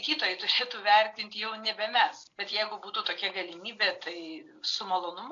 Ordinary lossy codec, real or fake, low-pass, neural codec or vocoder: AAC, 48 kbps; real; 7.2 kHz; none